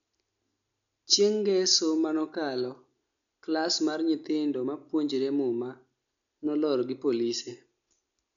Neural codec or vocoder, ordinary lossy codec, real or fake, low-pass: none; none; real; 7.2 kHz